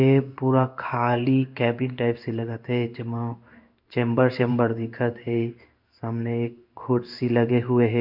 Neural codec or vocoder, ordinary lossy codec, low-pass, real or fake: codec, 16 kHz in and 24 kHz out, 1 kbps, XY-Tokenizer; MP3, 48 kbps; 5.4 kHz; fake